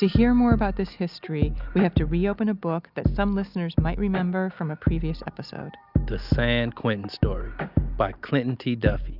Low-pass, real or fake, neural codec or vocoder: 5.4 kHz; real; none